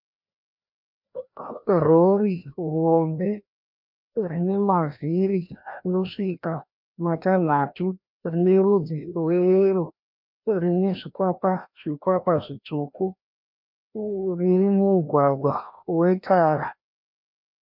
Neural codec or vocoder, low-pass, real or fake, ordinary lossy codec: codec, 16 kHz, 1 kbps, FreqCodec, larger model; 5.4 kHz; fake; MP3, 48 kbps